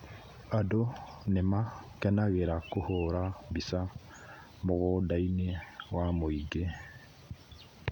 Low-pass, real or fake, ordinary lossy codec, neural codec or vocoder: 19.8 kHz; real; none; none